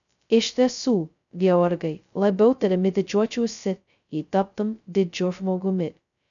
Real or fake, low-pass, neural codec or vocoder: fake; 7.2 kHz; codec, 16 kHz, 0.2 kbps, FocalCodec